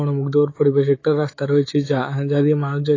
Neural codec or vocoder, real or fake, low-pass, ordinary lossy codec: autoencoder, 48 kHz, 128 numbers a frame, DAC-VAE, trained on Japanese speech; fake; 7.2 kHz; AAC, 32 kbps